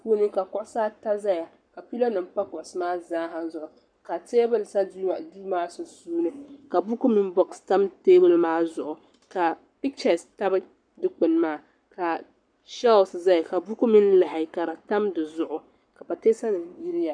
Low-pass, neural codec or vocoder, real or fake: 9.9 kHz; codec, 44.1 kHz, 7.8 kbps, Pupu-Codec; fake